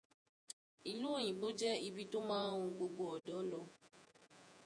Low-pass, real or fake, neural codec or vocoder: 9.9 kHz; fake; vocoder, 48 kHz, 128 mel bands, Vocos